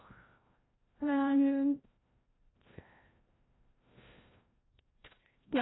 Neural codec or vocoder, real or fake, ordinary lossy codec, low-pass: codec, 16 kHz, 0.5 kbps, FreqCodec, larger model; fake; AAC, 16 kbps; 7.2 kHz